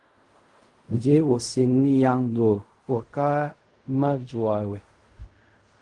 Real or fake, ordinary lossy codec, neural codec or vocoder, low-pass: fake; Opus, 24 kbps; codec, 16 kHz in and 24 kHz out, 0.4 kbps, LongCat-Audio-Codec, fine tuned four codebook decoder; 10.8 kHz